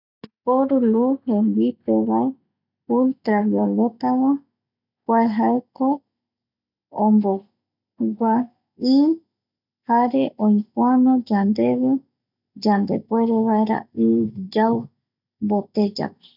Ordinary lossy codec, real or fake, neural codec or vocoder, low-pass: none; real; none; 5.4 kHz